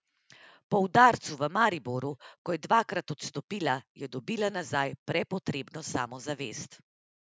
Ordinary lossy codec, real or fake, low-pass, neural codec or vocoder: none; real; none; none